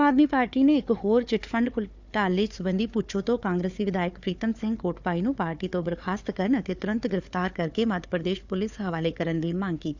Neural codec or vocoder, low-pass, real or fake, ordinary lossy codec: codec, 16 kHz, 4 kbps, FunCodec, trained on Chinese and English, 50 frames a second; 7.2 kHz; fake; none